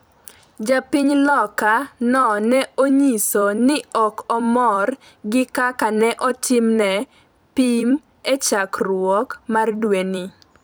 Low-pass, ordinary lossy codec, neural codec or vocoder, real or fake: none; none; vocoder, 44.1 kHz, 128 mel bands every 256 samples, BigVGAN v2; fake